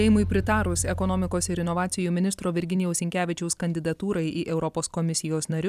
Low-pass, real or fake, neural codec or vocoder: 14.4 kHz; fake; vocoder, 44.1 kHz, 128 mel bands every 256 samples, BigVGAN v2